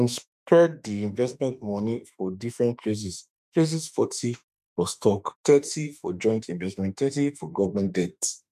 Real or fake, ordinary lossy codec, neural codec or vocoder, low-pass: fake; none; autoencoder, 48 kHz, 32 numbers a frame, DAC-VAE, trained on Japanese speech; 14.4 kHz